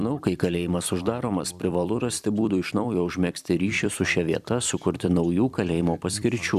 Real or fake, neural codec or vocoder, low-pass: fake; vocoder, 44.1 kHz, 128 mel bands every 256 samples, BigVGAN v2; 14.4 kHz